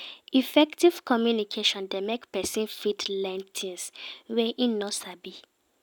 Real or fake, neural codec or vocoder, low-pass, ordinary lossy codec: real; none; none; none